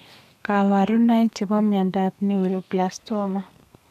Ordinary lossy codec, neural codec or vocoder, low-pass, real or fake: none; codec, 32 kHz, 1.9 kbps, SNAC; 14.4 kHz; fake